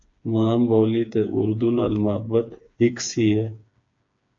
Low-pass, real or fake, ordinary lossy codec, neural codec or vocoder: 7.2 kHz; fake; AAC, 48 kbps; codec, 16 kHz, 4 kbps, FreqCodec, smaller model